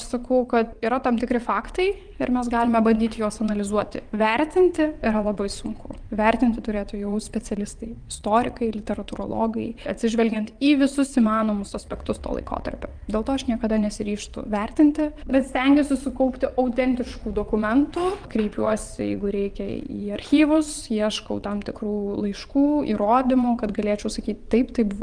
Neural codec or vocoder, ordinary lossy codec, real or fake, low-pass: vocoder, 22.05 kHz, 80 mel bands, WaveNeXt; Opus, 32 kbps; fake; 9.9 kHz